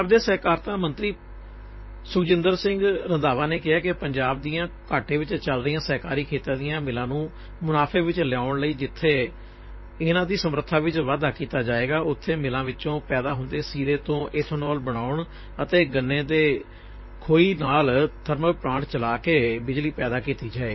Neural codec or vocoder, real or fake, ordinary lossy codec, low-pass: vocoder, 44.1 kHz, 128 mel bands, Pupu-Vocoder; fake; MP3, 24 kbps; 7.2 kHz